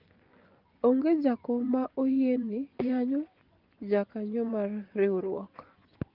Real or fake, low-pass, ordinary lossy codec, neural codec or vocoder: fake; 5.4 kHz; Opus, 32 kbps; vocoder, 24 kHz, 100 mel bands, Vocos